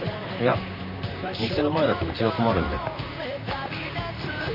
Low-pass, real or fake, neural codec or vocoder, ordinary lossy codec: 5.4 kHz; fake; vocoder, 44.1 kHz, 128 mel bands, Pupu-Vocoder; none